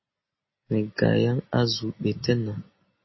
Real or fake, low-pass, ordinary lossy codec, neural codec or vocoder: real; 7.2 kHz; MP3, 24 kbps; none